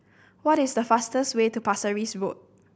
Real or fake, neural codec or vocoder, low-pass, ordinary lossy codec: real; none; none; none